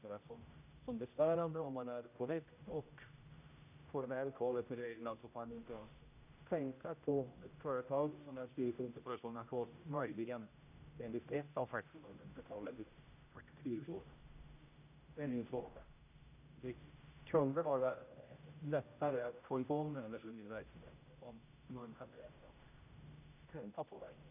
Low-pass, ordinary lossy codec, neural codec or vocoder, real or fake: 3.6 kHz; none; codec, 16 kHz, 0.5 kbps, X-Codec, HuBERT features, trained on general audio; fake